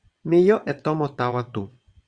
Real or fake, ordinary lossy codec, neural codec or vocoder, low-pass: fake; Opus, 64 kbps; vocoder, 22.05 kHz, 80 mel bands, WaveNeXt; 9.9 kHz